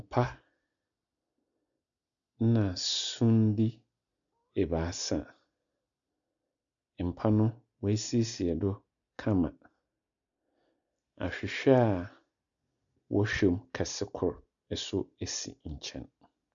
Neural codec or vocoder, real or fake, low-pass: none; real; 7.2 kHz